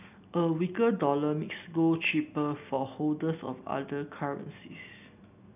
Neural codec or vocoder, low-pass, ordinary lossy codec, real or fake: none; 3.6 kHz; none; real